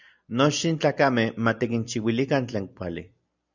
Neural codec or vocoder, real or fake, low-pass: none; real; 7.2 kHz